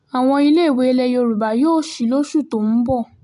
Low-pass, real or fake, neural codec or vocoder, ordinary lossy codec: 10.8 kHz; real; none; none